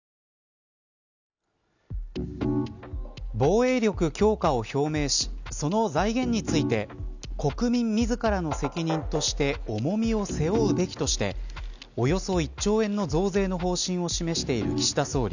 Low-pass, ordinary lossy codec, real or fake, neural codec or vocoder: 7.2 kHz; none; real; none